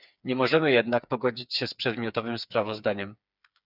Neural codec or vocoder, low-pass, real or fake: codec, 16 kHz, 4 kbps, FreqCodec, smaller model; 5.4 kHz; fake